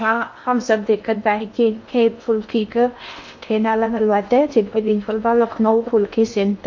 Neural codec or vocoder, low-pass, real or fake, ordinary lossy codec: codec, 16 kHz in and 24 kHz out, 0.6 kbps, FocalCodec, streaming, 2048 codes; 7.2 kHz; fake; MP3, 48 kbps